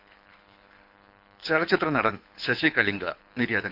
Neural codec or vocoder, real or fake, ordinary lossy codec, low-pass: codec, 24 kHz, 6 kbps, HILCodec; fake; none; 5.4 kHz